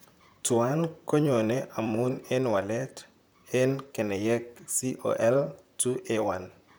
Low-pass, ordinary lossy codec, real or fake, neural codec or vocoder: none; none; fake; vocoder, 44.1 kHz, 128 mel bands, Pupu-Vocoder